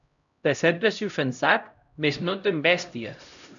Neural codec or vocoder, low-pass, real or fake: codec, 16 kHz, 0.5 kbps, X-Codec, HuBERT features, trained on LibriSpeech; 7.2 kHz; fake